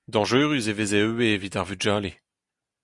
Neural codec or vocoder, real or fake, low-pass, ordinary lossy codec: none; real; 10.8 kHz; Opus, 64 kbps